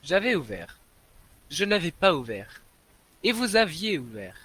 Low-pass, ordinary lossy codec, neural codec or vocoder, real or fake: 14.4 kHz; Opus, 32 kbps; none; real